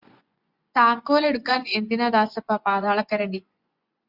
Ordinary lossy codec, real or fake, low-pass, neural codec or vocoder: Opus, 64 kbps; fake; 5.4 kHz; vocoder, 24 kHz, 100 mel bands, Vocos